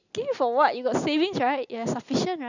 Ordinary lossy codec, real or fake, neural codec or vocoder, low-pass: none; real; none; 7.2 kHz